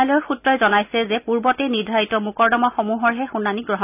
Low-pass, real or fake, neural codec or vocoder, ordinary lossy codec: 3.6 kHz; real; none; none